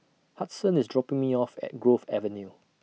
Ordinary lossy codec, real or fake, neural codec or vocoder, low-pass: none; real; none; none